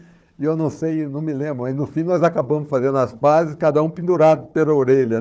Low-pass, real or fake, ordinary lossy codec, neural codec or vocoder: none; fake; none; codec, 16 kHz, 4 kbps, FunCodec, trained on Chinese and English, 50 frames a second